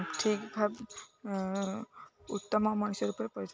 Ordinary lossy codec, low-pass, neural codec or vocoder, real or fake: none; none; none; real